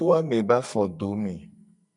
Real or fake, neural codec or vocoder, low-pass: fake; codec, 44.1 kHz, 2.6 kbps, SNAC; 10.8 kHz